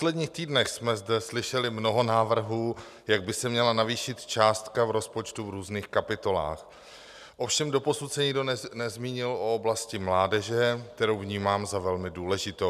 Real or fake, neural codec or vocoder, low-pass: real; none; 14.4 kHz